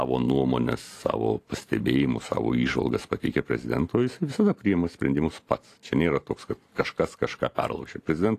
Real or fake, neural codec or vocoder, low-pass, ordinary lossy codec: fake; vocoder, 44.1 kHz, 128 mel bands every 512 samples, BigVGAN v2; 14.4 kHz; AAC, 48 kbps